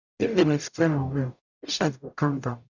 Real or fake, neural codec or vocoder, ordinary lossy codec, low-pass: fake; codec, 44.1 kHz, 0.9 kbps, DAC; none; 7.2 kHz